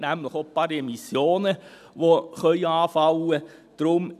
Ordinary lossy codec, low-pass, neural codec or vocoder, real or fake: none; 14.4 kHz; none; real